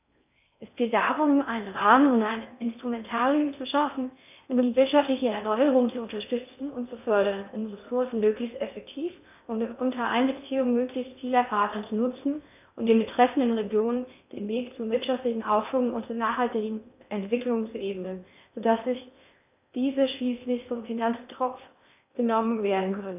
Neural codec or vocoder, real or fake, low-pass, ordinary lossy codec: codec, 16 kHz in and 24 kHz out, 0.6 kbps, FocalCodec, streaming, 4096 codes; fake; 3.6 kHz; none